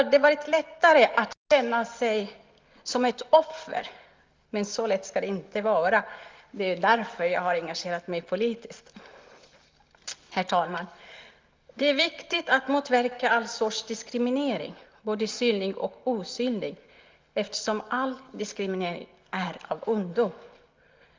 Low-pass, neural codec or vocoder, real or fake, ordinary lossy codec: 7.2 kHz; none; real; Opus, 24 kbps